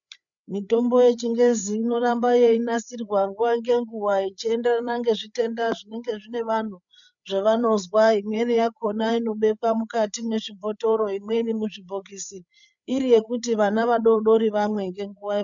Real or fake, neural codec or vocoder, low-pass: fake; codec, 16 kHz, 8 kbps, FreqCodec, larger model; 7.2 kHz